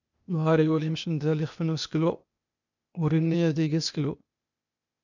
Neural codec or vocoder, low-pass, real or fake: codec, 16 kHz, 0.8 kbps, ZipCodec; 7.2 kHz; fake